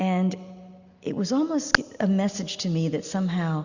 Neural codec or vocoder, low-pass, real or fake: none; 7.2 kHz; real